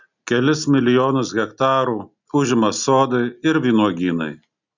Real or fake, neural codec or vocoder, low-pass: real; none; 7.2 kHz